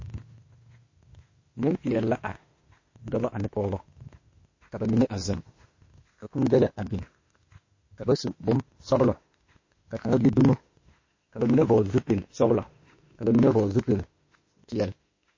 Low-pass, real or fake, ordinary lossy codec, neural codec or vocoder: 7.2 kHz; fake; MP3, 32 kbps; autoencoder, 48 kHz, 32 numbers a frame, DAC-VAE, trained on Japanese speech